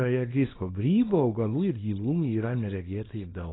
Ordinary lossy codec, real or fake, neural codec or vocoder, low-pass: AAC, 16 kbps; fake; codec, 24 kHz, 0.9 kbps, WavTokenizer, small release; 7.2 kHz